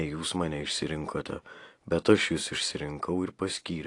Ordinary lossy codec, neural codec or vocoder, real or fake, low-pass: AAC, 48 kbps; none; real; 10.8 kHz